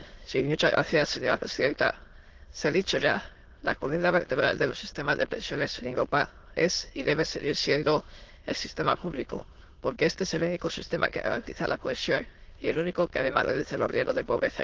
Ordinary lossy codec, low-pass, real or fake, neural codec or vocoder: Opus, 16 kbps; 7.2 kHz; fake; autoencoder, 22.05 kHz, a latent of 192 numbers a frame, VITS, trained on many speakers